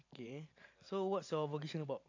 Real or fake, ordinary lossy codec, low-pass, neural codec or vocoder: fake; AAC, 48 kbps; 7.2 kHz; vocoder, 44.1 kHz, 128 mel bands every 512 samples, BigVGAN v2